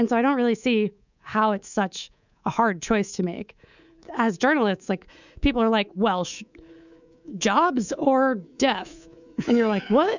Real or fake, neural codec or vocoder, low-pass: fake; codec, 24 kHz, 3.1 kbps, DualCodec; 7.2 kHz